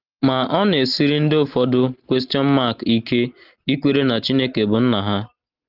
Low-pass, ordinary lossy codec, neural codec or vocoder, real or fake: 5.4 kHz; Opus, 32 kbps; none; real